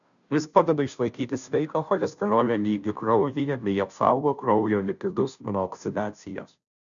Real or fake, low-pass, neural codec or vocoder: fake; 7.2 kHz; codec, 16 kHz, 0.5 kbps, FunCodec, trained on Chinese and English, 25 frames a second